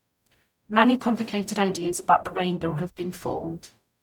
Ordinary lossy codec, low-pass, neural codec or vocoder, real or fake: none; 19.8 kHz; codec, 44.1 kHz, 0.9 kbps, DAC; fake